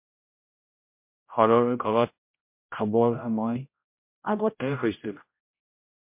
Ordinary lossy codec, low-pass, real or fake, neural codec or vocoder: MP3, 32 kbps; 3.6 kHz; fake; codec, 16 kHz, 0.5 kbps, X-Codec, HuBERT features, trained on general audio